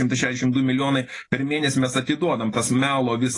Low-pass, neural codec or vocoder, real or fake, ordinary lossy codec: 10.8 kHz; none; real; AAC, 32 kbps